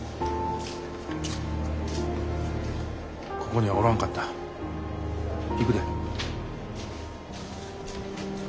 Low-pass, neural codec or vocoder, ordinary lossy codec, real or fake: none; none; none; real